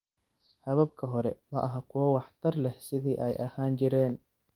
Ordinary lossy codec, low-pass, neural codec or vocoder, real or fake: Opus, 24 kbps; 19.8 kHz; autoencoder, 48 kHz, 128 numbers a frame, DAC-VAE, trained on Japanese speech; fake